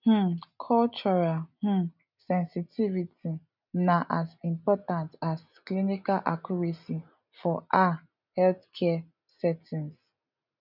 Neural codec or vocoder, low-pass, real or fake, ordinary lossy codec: none; 5.4 kHz; real; none